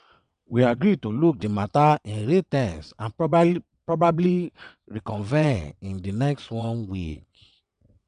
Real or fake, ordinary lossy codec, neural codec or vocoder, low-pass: fake; none; vocoder, 22.05 kHz, 80 mel bands, WaveNeXt; 9.9 kHz